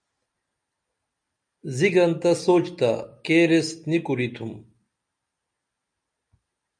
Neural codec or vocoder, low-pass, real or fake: none; 9.9 kHz; real